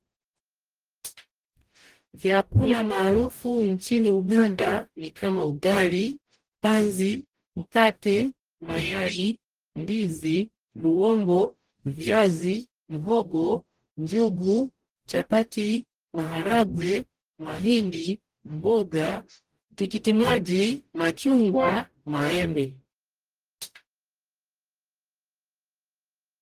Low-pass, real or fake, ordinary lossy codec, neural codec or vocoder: 14.4 kHz; fake; Opus, 24 kbps; codec, 44.1 kHz, 0.9 kbps, DAC